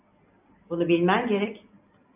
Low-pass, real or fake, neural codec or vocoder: 3.6 kHz; real; none